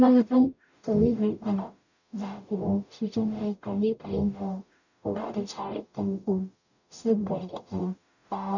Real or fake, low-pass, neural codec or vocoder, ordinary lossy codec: fake; 7.2 kHz; codec, 44.1 kHz, 0.9 kbps, DAC; none